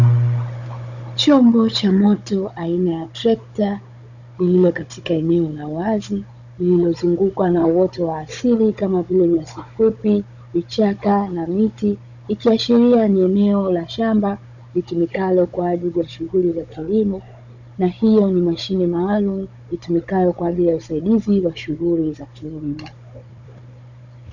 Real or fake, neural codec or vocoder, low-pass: fake; codec, 16 kHz, 16 kbps, FunCodec, trained on Chinese and English, 50 frames a second; 7.2 kHz